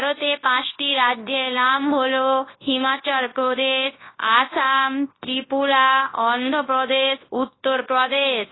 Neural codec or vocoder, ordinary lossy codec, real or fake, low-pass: codec, 24 kHz, 0.9 kbps, WavTokenizer, large speech release; AAC, 16 kbps; fake; 7.2 kHz